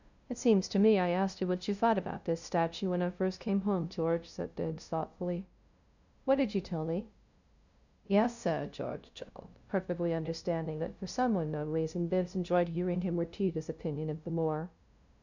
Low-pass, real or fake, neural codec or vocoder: 7.2 kHz; fake; codec, 16 kHz, 0.5 kbps, FunCodec, trained on LibriTTS, 25 frames a second